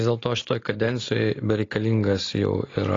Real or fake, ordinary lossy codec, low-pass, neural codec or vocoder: real; AAC, 32 kbps; 7.2 kHz; none